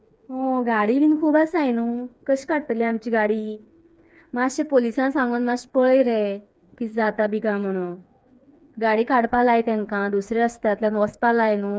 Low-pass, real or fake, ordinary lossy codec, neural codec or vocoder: none; fake; none; codec, 16 kHz, 4 kbps, FreqCodec, smaller model